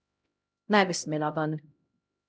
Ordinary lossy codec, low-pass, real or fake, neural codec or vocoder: none; none; fake; codec, 16 kHz, 0.5 kbps, X-Codec, HuBERT features, trained on LibriSpeech